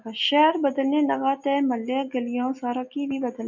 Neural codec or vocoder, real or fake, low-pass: none; real; 7.2 kHz